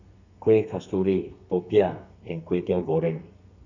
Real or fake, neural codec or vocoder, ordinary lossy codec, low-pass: fake; codec, 32 kHz, 1.9 kbps, SNAC; none; 7.2 kHz